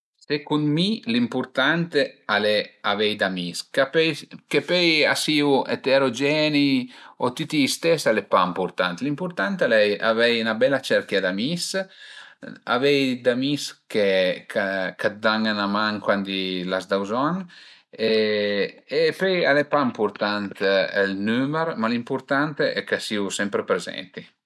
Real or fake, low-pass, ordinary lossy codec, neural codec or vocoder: real; none; none; none